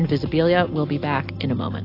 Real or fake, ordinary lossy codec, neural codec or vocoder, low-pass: real; AAC, 32 kbps; none; 5.4 kHz